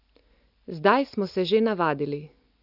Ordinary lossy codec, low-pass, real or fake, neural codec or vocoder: none; 5.4 kHz; real; none